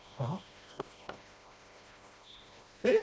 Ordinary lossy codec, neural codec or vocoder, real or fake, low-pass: none; codec, 16 kHz, 1 kbps, FreqCodec, smaller model; fake; none